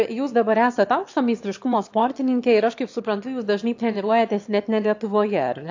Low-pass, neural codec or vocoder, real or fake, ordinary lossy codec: 7.2 kHz; autoencoder, 22.05 kHz, a latent of 192 numbers a frame, VITS, trained on one speaker; fake; AAC, 48 kbps